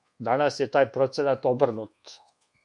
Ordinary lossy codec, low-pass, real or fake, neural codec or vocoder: MP3, 64 kbps; 10.8 kHz; fake; codec, 24 kHz, 1.2 kbps, DualCodec